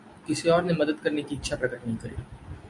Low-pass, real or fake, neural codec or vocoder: 10.8 kHz; real; none